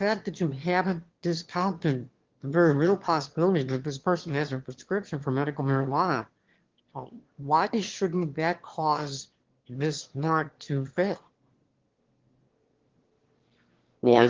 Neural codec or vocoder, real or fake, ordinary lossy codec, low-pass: autoencoder, 22.05 kHz, a latent of 192 numbers a frame, VITS, trained on one speaker; fake; Opus, 16 kbps; 7.2 kHz